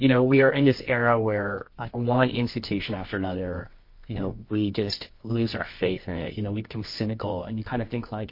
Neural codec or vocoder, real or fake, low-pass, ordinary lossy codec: codec, 24 kHz, 0.9 kbps, WavTokenizer, medium music audio release; fake; 5.4 kHz; MP3, 32 kbps